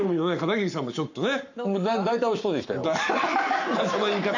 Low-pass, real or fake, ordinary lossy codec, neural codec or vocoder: 7.2 kHz; fake; none; codec, 44.1 kHz, 7.8 kbps, Pupu-Codec